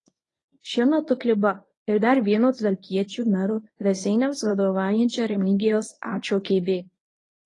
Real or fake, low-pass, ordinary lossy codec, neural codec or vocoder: fake; 10.8 kHz; AAC, 32 kbps; codec, 24 kHz, 0.9 kbps, WavTokenizer, medium speech release version 1